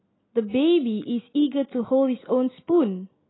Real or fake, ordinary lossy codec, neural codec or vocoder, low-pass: real; AAC, 16 kbps; none; 7.2 kHz